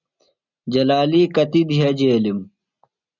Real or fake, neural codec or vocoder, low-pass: real; none; 7.2 kHz